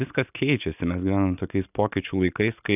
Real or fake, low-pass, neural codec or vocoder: real; 3.6 kHz; none